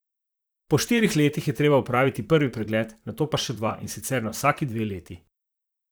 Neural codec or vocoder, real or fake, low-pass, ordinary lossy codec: vocoder, 44.1 kHz, 128 mel bands, Pupu-Vocoder; fake; none; none